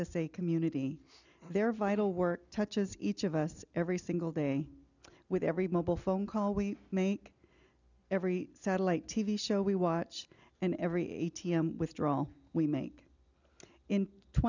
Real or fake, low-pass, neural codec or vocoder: real; 7.2 kHz; none